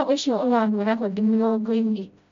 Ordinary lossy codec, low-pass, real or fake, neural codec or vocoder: MP3, 64 kbps; 7.2 kHz; fake; codec, 16 kHz, 0.5 kbps, FreqCodec, smaller model